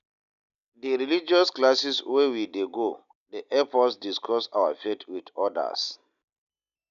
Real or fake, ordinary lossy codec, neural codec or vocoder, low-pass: real; none; none; 7.2 kHz